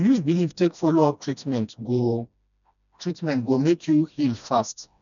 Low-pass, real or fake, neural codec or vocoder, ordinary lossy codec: 7.2 kHz; fake; codec, 16 kHz, 1 kbps, FreqCodec, smaller model; none